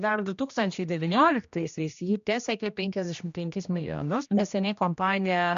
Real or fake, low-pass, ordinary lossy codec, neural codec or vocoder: fake; 7.2 kHz; MP3, 64 kbps; codec, 16 kHz, 1 kbps, X-Codec, HuBERT features, trained on general audio